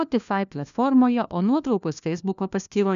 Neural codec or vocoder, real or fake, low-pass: codec, 16 kHz, 1 kbps, FunCodec, trained on LibriTTS, 50 frames a second; fake; 7.2 kHz